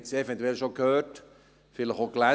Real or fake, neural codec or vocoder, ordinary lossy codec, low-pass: real; none; none; none